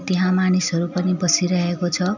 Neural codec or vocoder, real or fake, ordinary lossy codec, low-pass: none; real; none; 7.2 kHz